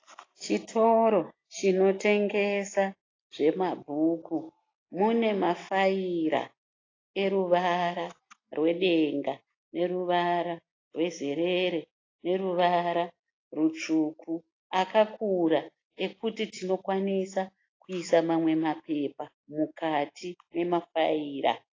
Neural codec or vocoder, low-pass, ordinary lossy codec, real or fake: none; 7.2 kHz; AAC, 32 kbps; real